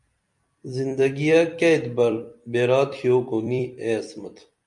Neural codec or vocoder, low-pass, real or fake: vocoder, 44.1 kHz, 128 mel bands every 256 samples, BigVGAN v2; 10.8 kHz; fake